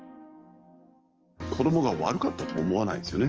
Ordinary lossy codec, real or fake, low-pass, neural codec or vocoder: Opus, 24 kbps; fake; 7.2 kHz; codec, 44.1 kHz, 7.8 kbps, DAC